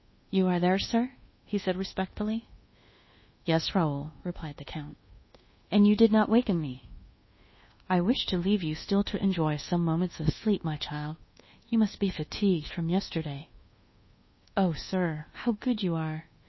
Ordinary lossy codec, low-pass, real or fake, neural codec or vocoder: MP3, 24 kbps; 7.2 kHz; fake; codec, 24 kHz, 1.2 kbps, DualCodec